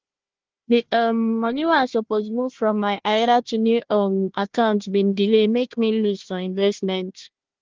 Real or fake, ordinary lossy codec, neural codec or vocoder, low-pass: fake; Opus, 16 kbps; codec, 16 kHz, 1 kbps, FunCodec, trained on Chinese and English, 50 frames a second; 7.2 kHz